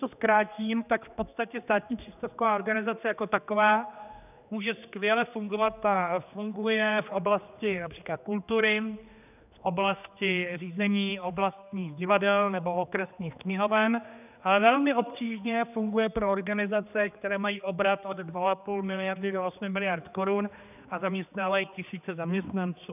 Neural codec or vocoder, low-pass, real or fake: codec, 16 kHz, 2 kbps, X-Codec, HuBERT features, trained on general audio; 3.6 kHz; fake